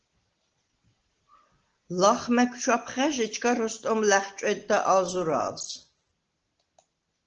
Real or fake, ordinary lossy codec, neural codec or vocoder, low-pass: real; Opus, 16 kbps; none; 7.2 kHz